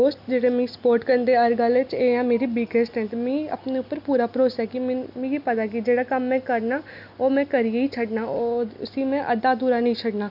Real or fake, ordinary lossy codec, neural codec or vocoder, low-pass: real; none; none; 5.4 kHz